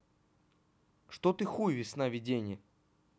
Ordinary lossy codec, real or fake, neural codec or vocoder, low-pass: none; real; none; none